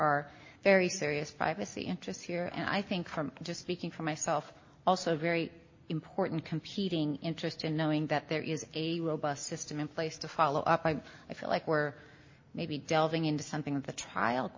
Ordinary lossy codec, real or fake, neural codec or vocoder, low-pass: MP3, 32 kbps; real; none; 7.2 kHz